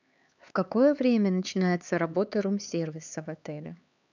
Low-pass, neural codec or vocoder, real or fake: 7.2 kHz; codec, 16 kHz, 4 kbps, X-Codec, HuBERT features, trained on LibriSpeech; fake